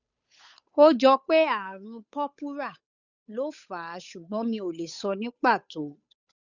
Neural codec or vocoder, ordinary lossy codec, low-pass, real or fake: codec, 16 kHz, 8 kbps, FunCodec, trained on Chinese and English, 25 frames a second; none; 7.2 kHz; fake